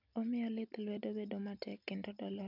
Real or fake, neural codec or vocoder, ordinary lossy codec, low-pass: real; none; none; 5.4 kHz